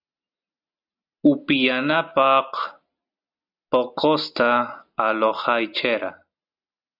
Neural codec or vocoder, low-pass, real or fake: none; 5.4 kHz; real